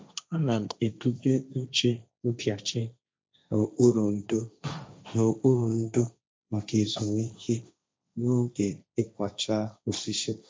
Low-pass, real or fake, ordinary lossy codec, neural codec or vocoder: none; fake; none; codec, 16 kHz, 1.1 kbps, Voila-Tokenizer